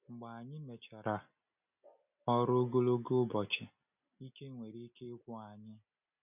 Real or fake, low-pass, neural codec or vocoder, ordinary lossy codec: real; 3.6 kHz; none; none